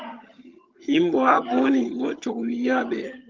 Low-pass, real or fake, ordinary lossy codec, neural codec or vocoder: 7.2 kHz; fake; Opus, 32 kbps; vocoder, 22.05 kHz, 80 mel bands, HiFi-GAN